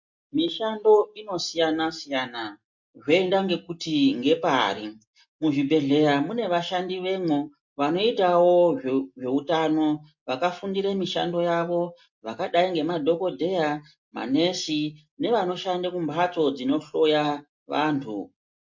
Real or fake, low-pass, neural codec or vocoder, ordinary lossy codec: real; 7.2 kHz; none; MP3, 48 kbps